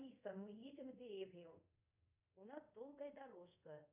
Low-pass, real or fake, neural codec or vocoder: 3.6 kHz; fake; codec, 24 kHz, 0.5 kbps, DualCodec